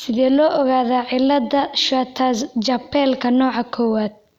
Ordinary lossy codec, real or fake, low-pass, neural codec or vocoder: none; real; 19.8 kHz; none